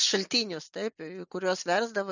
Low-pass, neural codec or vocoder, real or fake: 7.2 kHz; none; real